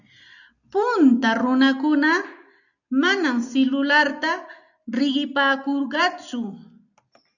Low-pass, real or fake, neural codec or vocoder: 7.2 kHz; real; none